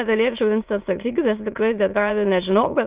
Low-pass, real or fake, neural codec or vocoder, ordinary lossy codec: 3.6 kHz; fake; autoencoder, 22.05 kHz, a latent of 192 numbers a frame, VITS, trained on many speakers; Opus, 32 kbps